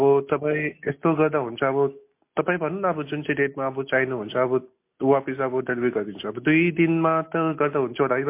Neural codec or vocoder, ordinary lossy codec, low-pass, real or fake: none; MP3, 24 kbps; 3.6 kHz; real